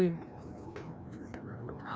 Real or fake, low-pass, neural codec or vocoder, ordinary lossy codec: fake; none; codec, 16 kHz, 1 kbps, FreqCodec, larger model; none